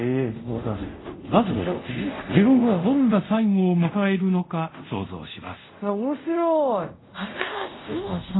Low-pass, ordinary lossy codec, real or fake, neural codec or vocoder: 7.2 kHz; AAC, 16 kbps; fake; codec, 24 kHz, 0.5 kbps, DualCodec